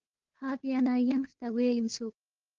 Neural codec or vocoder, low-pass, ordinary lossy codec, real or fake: codec, 16 kHz, 2 kbps, FunCodec, trained on Chinese and English, 25 frames a second; 7.2 kHz; Opus, 16 kbps; fake